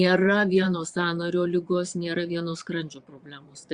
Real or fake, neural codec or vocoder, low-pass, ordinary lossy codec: fake; vocoder, 22.05 kHz, 80 mel bands, Vocos; 9.9 kHz; Opus, 64 kbps